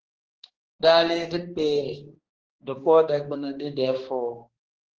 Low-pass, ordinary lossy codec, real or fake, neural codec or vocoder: 7.2 kHz; Opus, 16 kbps; fake; codec, 16 kHz, 2 kbps, X-Codec, HuBERT features, trained on balanced general audio